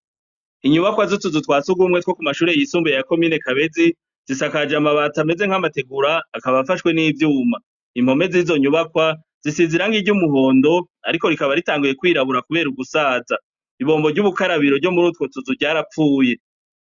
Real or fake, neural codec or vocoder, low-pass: real; none; 7.2 kHz